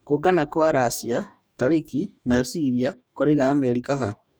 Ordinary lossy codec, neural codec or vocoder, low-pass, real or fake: none; codec, 44.1 kHz, 2.6 kbps, DAC; none; fake